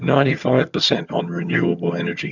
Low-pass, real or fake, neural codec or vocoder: 7.2 kHz; fake; vocoder, 22.05 kHz, 80 mel bands, HiFi-GAN